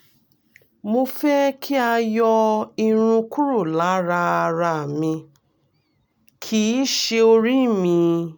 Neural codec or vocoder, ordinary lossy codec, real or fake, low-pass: none; none; real; none